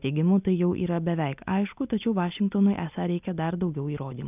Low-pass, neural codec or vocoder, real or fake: 3.6 kHz; none; real